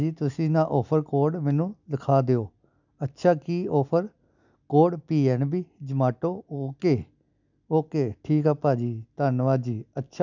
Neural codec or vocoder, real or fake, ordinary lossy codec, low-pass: none; real; none; 7.2 kHz